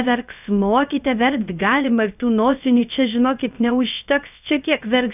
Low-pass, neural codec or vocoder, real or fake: 3.6 kHz; codec, 16 kHz, 0.3 kbps, FocalCodec; fake